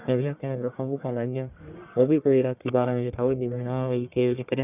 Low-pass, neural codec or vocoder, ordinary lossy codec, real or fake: 3.6 kHz; codec, 44.1 kHz, 1.7 kbps, Pupu-Codec; none; fake